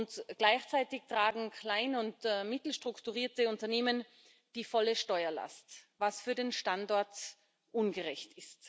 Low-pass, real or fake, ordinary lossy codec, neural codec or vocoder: none; real; none; none